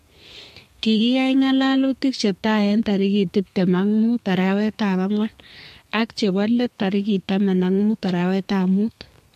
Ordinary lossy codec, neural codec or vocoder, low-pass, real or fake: MP3, 64 kbps; codec, 32 kHz, 1.9 kbps, SNAC; 14.4 kHz; fake